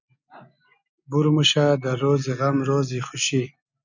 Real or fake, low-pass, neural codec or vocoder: real; 7.2 kHz; none